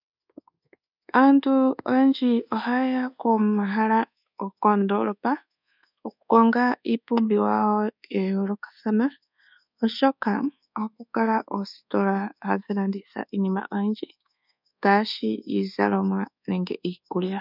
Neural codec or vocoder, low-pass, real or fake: codec, 24 kHz, 1.2 kbps, DualCodec; 5.4 kHz; fake